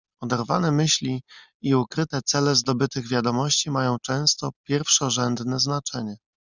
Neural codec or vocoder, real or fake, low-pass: none; real; 7.2 kHz